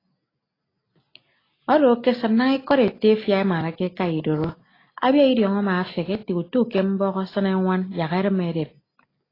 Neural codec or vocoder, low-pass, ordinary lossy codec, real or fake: none; 5.4 kHz; AAC, 24 kbps; real